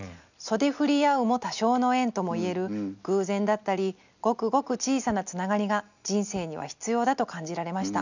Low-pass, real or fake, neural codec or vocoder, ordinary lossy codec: 7.2 kHz; real; none; none